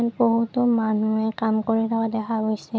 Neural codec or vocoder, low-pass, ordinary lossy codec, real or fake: none; none; none; real